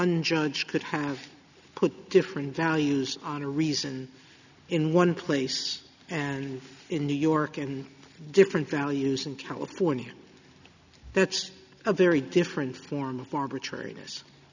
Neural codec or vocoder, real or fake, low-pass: none; real; 7.2 kHz